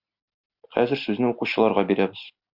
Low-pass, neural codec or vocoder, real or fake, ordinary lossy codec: 5.4 kHz; none; real; Opus, 64 kbps